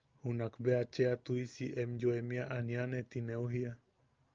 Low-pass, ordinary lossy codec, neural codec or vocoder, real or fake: 7.2 kHz; Opus, 24 kbps; none; real